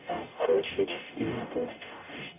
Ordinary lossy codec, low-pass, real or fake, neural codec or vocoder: none; 3.6 kHz; fake; codec, 44.1 kHz, 0.9 kbps, DAC